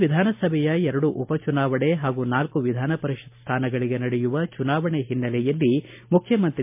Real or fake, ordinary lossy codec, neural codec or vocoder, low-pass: real; MP3, 32 kbps; none; 3.6 kHz